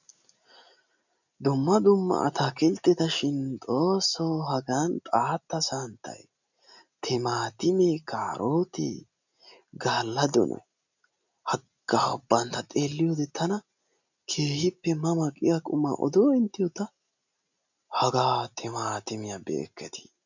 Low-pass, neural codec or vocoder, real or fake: 7.2 kHz; none; real